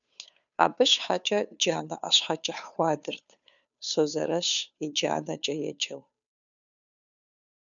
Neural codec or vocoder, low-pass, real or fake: codec, 16 kHz, 2 kbps, FunCodec, trained on Chinese and English, 25 frames a second; 7.2 kHz; fake